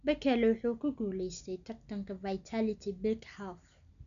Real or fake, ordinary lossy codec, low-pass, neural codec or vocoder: real; none; 7.2 kHz; none